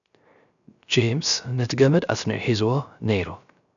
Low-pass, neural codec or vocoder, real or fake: 7.2 kHz; codec, 16 kHz, 0.3 kbps, FocalCodec; fake